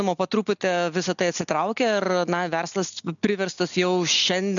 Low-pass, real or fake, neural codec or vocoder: 7.2 kHz; real; none